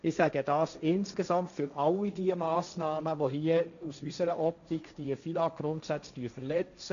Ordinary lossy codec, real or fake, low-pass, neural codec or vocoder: none; fake; 7.2 kHz; codec, 16 kHz, 1.1 kbps, Voila-Tokenizer